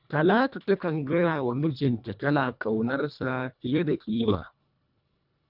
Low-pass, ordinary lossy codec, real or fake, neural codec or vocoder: 5.4 kHz; none; fake; codec, 24 kHz, 1.5 kbps, HILCodec